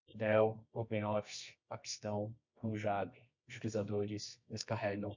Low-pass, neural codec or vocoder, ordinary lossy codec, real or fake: 7.2 kHz; codec, 24 kHz, 0.9 kbps, WavTokenizer, medium music audio release; MP3, 48 kbps; fake